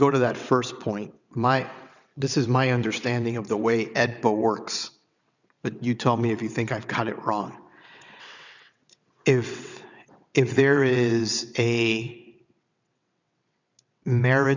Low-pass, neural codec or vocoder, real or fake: 7.2 kHz; vocoder, 22.05 kHz, 80 mel bands, WaveNeXt; fake